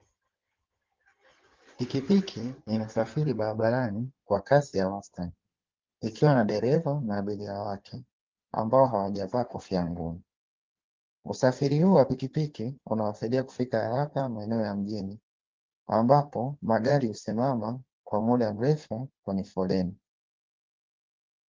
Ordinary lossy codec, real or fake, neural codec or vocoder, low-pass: Opus, 32 kbps; fake; codec, 16 kHz in and 24 kHz out, 2.2 kbps, FireRedTTS-2 codec; 7.2 kHz